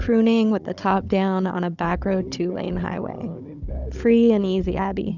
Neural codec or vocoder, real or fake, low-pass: codec, 16 kHz, 16 kbps, FunCodec, trained on Chinese and English, 50 frames a second; fake; 7.2 kHz